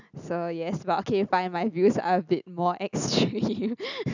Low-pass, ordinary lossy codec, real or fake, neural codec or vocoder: 7.2 kHz; none; real; none